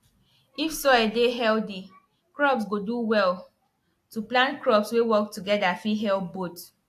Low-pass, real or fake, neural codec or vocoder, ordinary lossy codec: 14.4 kHz; real; none; AAC, 64 kbps